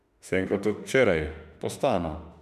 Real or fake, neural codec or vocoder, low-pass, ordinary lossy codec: fake; autoencoder, 48 kHz, 32 numbers a frame, DAC-VAE, trained on Japanese speech; 14.4 kHz; none